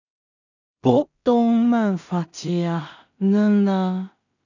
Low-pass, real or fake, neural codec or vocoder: 7.2 kHz; fake; codec, 16 kHz in and 24 kHz out, 0.4 kbps, LongCat-Audio-Codec, two codebook decoder